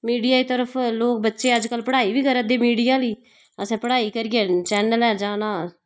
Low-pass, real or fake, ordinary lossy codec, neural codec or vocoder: none; real; none; none